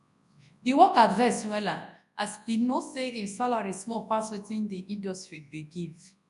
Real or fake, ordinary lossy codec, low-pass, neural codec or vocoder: fake; none; 10.8 kHz; codec, 24 kHz, 0.9 kbps, WavTokenizer, large speech release